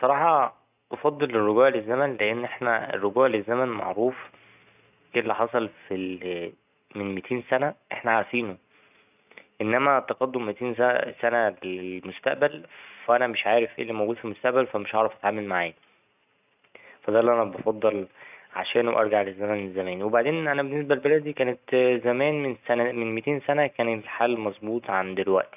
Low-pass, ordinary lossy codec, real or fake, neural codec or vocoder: 3.6 kHz; none; real; none